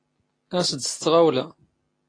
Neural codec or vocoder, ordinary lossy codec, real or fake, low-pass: none; AAC, 32 kbps; real; 9.9 kHz